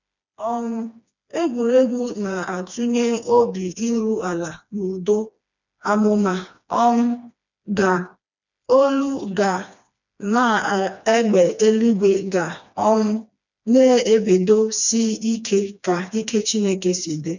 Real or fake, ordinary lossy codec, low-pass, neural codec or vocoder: fake; none; 7.2 kHz; codec, 16 kHz, 2 kbps, FreqCodec, smaller model